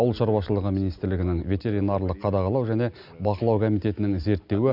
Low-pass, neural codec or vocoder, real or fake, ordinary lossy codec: 5.4 kHz; none; real; none